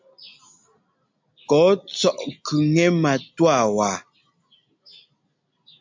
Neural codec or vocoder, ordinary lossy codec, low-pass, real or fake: none; MP3, 64 kbps; 7.2 kHz; real